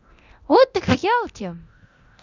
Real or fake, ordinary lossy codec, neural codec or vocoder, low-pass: fake; none; codec, 24 kHz, 0.9 kbps, DualCodec; 7.2 kHz